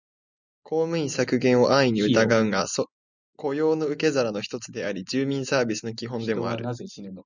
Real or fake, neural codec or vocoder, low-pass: real; none; 7.2 kHz